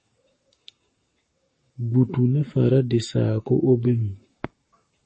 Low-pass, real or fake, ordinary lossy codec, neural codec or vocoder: 10.8 kHz; fake; MP3, 32 kbps; codec, 44.1 kHz, 7.8 kbps, Pupu-Codec